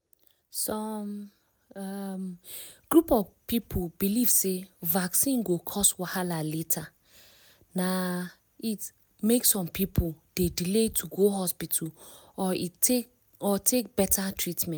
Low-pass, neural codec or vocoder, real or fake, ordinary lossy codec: none; none; real; none